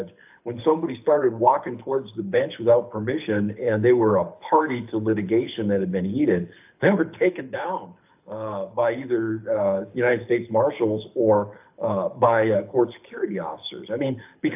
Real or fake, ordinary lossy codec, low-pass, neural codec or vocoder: fake; AAC, 32 kbps; 3.6 kHz; codec, 24 kHz, 6 kbps, HILCodec